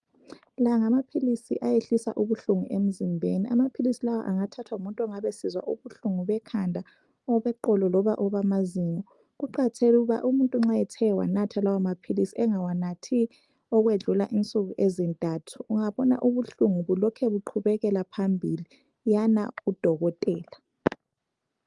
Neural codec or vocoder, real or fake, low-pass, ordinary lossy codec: none; real; 10.8 kHz; Opus, 32 kbps